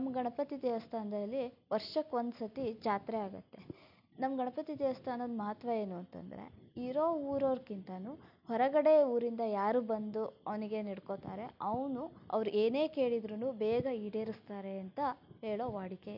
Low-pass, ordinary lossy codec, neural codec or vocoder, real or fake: 5.4 kHz; none; none; real